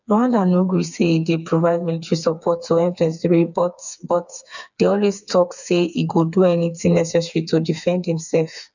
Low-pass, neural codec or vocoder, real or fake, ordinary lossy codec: 7.2 kHz; codec, 16 kHz, 4 kbps, FreqCodec, smaller model; fake; none